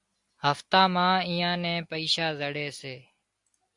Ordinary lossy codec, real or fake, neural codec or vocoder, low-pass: AAC, 64 kbps; real; none; 10.8 kHz